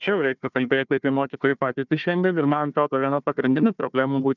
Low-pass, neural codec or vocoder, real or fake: 7.2 kHz; codec, 16 kHz, 1 kbps, FunCodec, trained on Chinese and English, 50 frames a second; fake